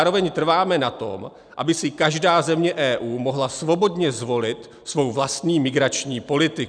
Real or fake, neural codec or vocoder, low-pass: real; none; 9.9 kHz